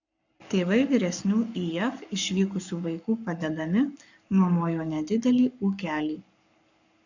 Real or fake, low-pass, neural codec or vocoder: fake; 7.2 kHz; codec, 44.1 kHz, 7.8 kbps, Pupu-Codec